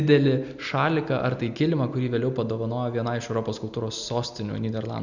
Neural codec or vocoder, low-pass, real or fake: none; 7.2 kHz; real